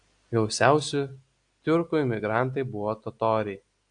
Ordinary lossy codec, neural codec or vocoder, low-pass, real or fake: AAC, 64 kbps; none; 9.9 kHz; real